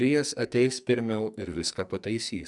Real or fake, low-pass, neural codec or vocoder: fake; 10.8 kHz; codec, 44.1 kHz, 2.6 kbps, SNAC